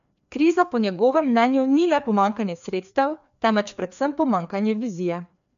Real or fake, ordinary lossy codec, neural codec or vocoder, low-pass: fake; AAC, 96 kbps; codec, 16 kHz, 2 kbps, FreqCodec, larger model; 7.2 kHz